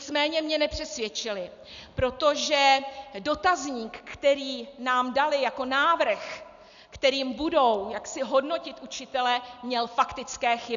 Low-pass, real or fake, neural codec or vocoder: 7.2 kHz; real; none